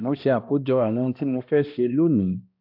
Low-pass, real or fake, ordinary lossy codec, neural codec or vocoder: 5.4 kHz; fake; AAC, 48 kbps; codec, 16 kHz, 1 kbps, X-Codec, HuBERT features, trained on balanced general audio